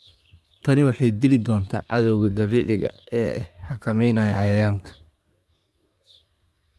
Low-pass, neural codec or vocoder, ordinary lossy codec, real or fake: none; codec, 24 kHz, 1 kbps, SNAC; none; fake